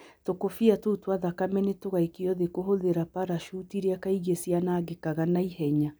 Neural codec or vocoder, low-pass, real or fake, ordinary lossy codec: none; none; real; none